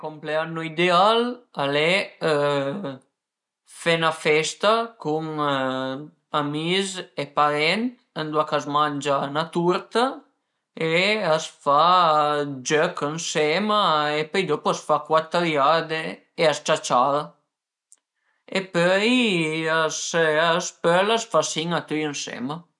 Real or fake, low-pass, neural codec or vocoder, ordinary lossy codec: real; 10.8 kHz; none; none